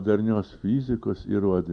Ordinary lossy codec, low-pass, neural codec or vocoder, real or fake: Opus, 64 kbps; 9.9 kHz; none; real